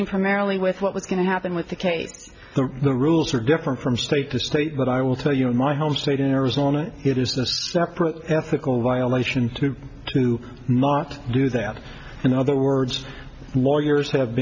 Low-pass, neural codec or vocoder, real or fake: 7.2 kHz; none; real